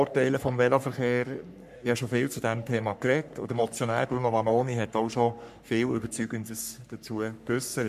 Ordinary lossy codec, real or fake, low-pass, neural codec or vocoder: none; fake; 14.4 kHz; codec, 44.1 kHz, 3.4 kbps, Pupu-Codec